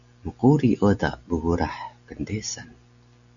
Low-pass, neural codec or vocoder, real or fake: 7.2 kHz; none; real